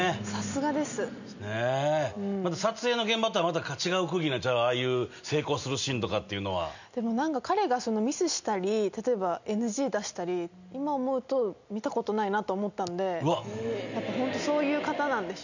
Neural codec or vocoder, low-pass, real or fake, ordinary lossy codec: none; 7.2 kHz; real; none